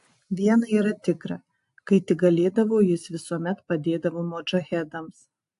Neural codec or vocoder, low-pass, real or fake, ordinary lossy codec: none; 10.8 kHz; real; AAC, 64 kbps